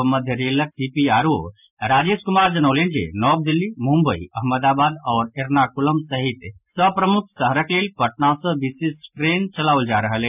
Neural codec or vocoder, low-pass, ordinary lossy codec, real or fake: none; 3.6 kHz; none; real